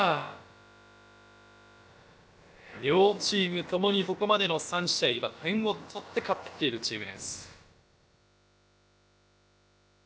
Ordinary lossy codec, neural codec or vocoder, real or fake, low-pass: none; codec, 16 kHz, about 1 kbps, DyCAST, with the encoder's durations; fake; none